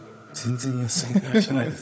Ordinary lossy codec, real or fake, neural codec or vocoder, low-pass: none; fake; codec, 16 kHz, 16 kbps, FunCodec, trained on Chinese and English, 50 frames a second; none